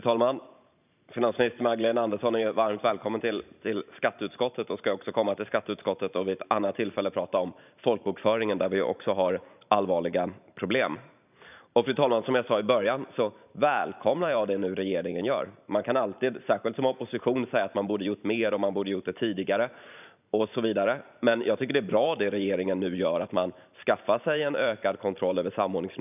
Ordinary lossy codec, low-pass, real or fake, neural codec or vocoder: none; 3.6 kHz; fake; vocoder, 44.1 kHz, 128 mel bands every 512 samples, BigVGAN v2